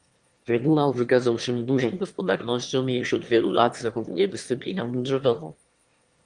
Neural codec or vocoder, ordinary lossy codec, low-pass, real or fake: autoencoder, 22.05 kHz, a latent of 192 numbers a frame, VITS, trained on one speaker; Opus, 24 kbps; 9.9 kHz; fake